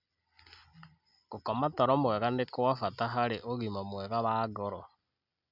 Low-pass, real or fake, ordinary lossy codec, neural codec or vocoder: 5.4 kHz; real; none; none